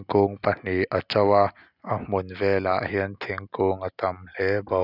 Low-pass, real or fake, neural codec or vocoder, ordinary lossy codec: 5.4 kHz; real; none; none